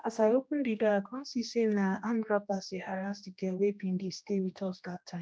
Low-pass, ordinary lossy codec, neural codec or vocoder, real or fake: none; none; codec, 16 kHz, 1 kbps, X-Codec, HuBERT features, trained on general audio; fake